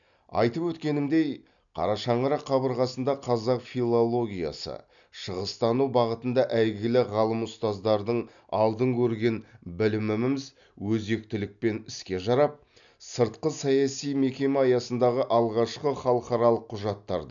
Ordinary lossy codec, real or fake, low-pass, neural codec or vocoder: none; real; 7.2 kHz; none